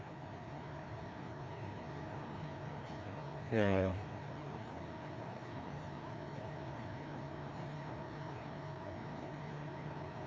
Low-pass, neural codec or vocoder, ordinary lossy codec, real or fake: none; codec, 16 kHz, 2 kbps, FreqCodec, larger model; none; fake